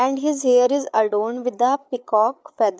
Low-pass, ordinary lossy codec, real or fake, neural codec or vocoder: none; none; fake; codec, 16 kHz, 8 kbps, FreqCodec, larger model